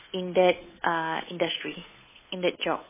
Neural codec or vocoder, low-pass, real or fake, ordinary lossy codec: codec, 16 kHz, 8 kbps, FunCodec, trained on Chinese and English, 25 frames a second; 3.6 kHz; fake; MP3, 16 kbps